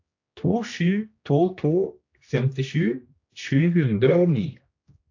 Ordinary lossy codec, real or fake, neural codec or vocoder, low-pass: AAC, 48 kbps; fake; codec, 16 kHz, 1 kbps, X-Codec, HuBERT features, trained on general audio; 7.2 kHz